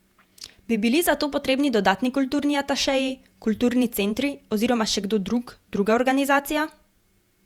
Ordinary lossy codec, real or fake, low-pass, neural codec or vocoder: Opus, 64 kbps; fake; 19.8 kHz; vocoder, 48 kHz, 128 mel bands, Vocos